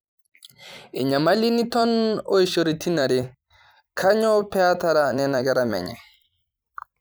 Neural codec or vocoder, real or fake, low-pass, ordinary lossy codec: vocoder, 44.1 kHz, 128 mel bands every 256 samples, BigVGAN v2; fake; none; none